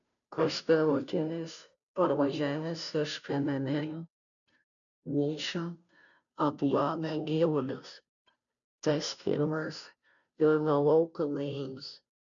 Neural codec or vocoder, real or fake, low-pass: codec, 16 kHz, 0.5 kbps, FunCodec, trained on Chinese and English, 25 frames a second; fake; 7.2 kHz